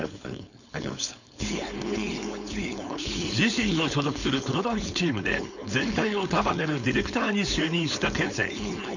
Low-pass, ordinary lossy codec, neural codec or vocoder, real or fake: 7.2 kHz; none; codec, 16 kHz, 4.8 kbps, FACodec; fake